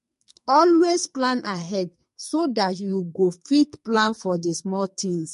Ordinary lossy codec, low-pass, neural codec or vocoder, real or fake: MP3, 48 kbps; 14.4 kHz; codec, 44.1 kHz, 2.6 kbps, SNAC; fake